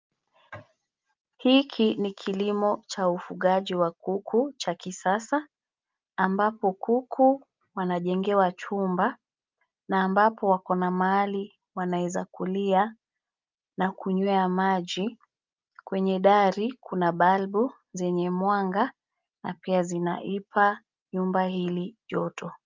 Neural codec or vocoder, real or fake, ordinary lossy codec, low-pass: none; real; Opus, 24 kbps; 7.2 kHz